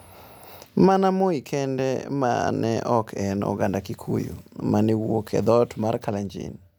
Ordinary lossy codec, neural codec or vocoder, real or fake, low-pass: none; none; real; none